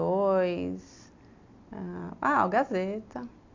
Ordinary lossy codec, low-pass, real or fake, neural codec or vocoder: AAC, 48 kbps; 7.2 kHz; real; none